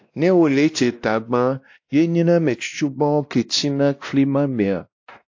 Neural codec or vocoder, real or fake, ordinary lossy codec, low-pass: codec, 16 kHz, 1 kbps, X-Codec, WavLM features, trained on Multilingual LibriSpeech; fake; AAC, 48 kbps; 7.2 kHz